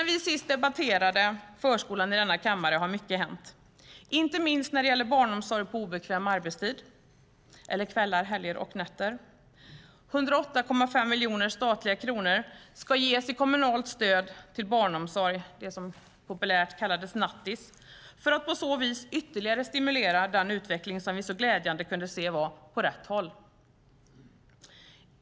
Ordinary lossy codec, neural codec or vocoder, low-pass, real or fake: none; none; none; real